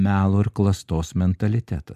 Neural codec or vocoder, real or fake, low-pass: none; real; 14.4 kHz